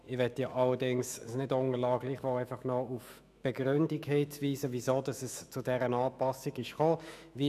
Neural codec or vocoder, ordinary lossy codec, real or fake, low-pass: autoencoder, 48 kHz, 128 numbers a frame, DAC-VAE, trained on Japanese speech; none; fake; 14.4 kHz